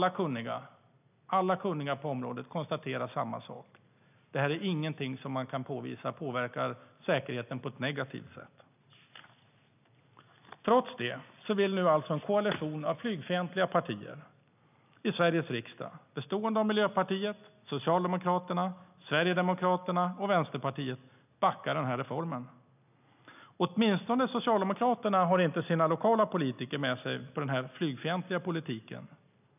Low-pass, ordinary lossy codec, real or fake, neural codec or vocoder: 3.6 kHz; none; real; none